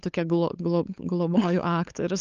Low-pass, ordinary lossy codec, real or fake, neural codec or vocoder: 7.2 kHz; Opus, 24 kbps; fake; codec, 16 kHz, 8 kbps, FunCodec, trained on LibriTTS, 25 frames a second